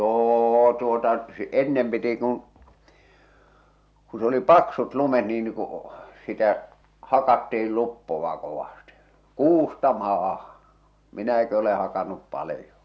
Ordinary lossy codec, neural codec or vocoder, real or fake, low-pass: none; none; real; none